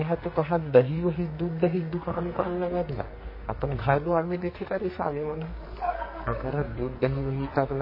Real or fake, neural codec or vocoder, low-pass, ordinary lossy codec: fake; codec, 44.1 kHz, 2.6 kbps, SNAC; 5.4 kHz; MP3, 24 kbps